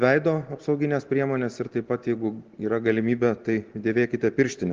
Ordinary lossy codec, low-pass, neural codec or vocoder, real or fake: Opus, 16 kbps; 7.2 kHz; none; real